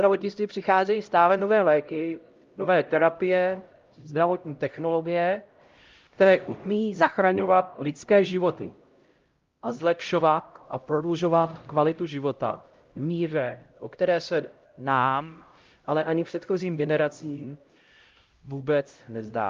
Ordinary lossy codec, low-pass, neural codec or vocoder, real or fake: Opus, 32 kbps; 7.2 kHz; codec, 16 kHz, 0.5 kbps, X-Codec, HuBERT features, trained on LibriSpeech; fake